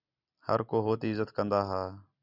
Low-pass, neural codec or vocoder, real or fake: 5.4 kHz; none; real